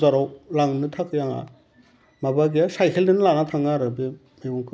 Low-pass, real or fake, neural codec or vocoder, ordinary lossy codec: none; real; none; none